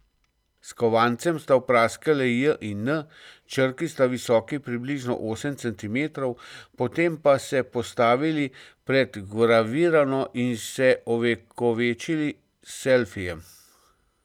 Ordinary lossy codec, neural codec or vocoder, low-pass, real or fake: none; none; 19.8 kHz; real